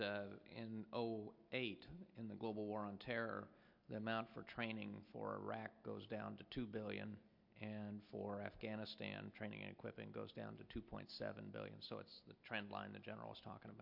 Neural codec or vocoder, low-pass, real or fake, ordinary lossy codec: none; 5.4 kHz; real; MP3, 48 kbps